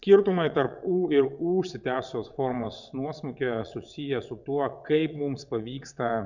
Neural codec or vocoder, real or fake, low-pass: vocoder, 22.05 kHz, 80 mel bands, WaveNeXt; fake; 7.2 kHz